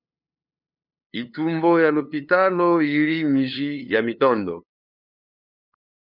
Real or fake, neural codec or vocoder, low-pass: fake; codec, 16 kHz, 2 kbps, FunCodec, trained on LibriTTS, 25 frames a second; 5.4 kHz